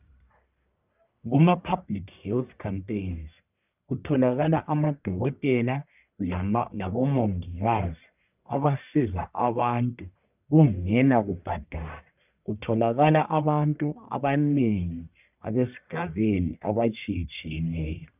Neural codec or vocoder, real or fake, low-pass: codec, 44.1 kHz, 1.7 kbps, Pupu-Codec; fake; 3.6 kHz